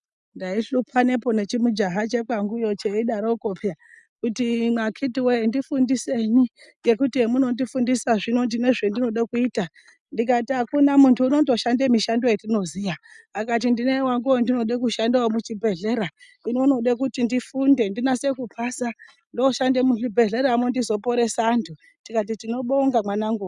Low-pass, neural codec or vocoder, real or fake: 10.8 kHz; none; real